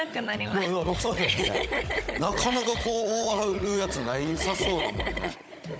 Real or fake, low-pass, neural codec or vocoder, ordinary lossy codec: fake; none; codec, 16 kHz, 16 kbps, FunCodec, trained on Chinese and English, 50 frames a second; none